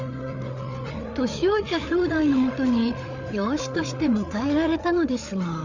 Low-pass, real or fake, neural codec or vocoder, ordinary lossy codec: 7.2 kHz; fake; codec, 16 kHz, 8 kbps, FreqCodec, larger model; Opus, 64 kbps